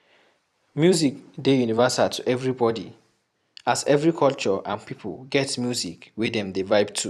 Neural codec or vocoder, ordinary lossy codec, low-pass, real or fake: vocoder, 44.1 kHz, 128 mel bands every 256 samples, BigVGAN v2; none; 14.4 kHz; fake